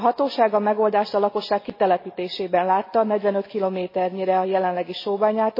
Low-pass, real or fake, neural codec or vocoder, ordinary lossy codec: 5.4 kHz; real; none; MP3, 24 kbps